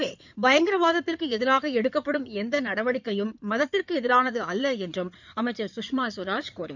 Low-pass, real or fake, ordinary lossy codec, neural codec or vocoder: 7.2 kHz; fake; none; codec, 16 kHz in and 24 kHz out, 2.2 kbps, FireRedTTS-2 codec